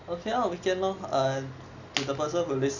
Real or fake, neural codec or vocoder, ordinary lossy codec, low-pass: real; none; none; 7.2 kHz